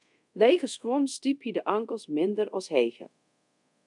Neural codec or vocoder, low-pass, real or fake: codec, 24 kHz, 0.5 kbps, DualCodec; 10.8 kHz; fake